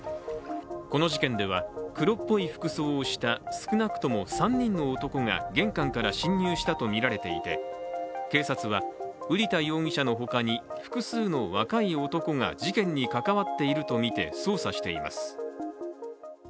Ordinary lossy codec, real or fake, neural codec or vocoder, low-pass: none; real; none; none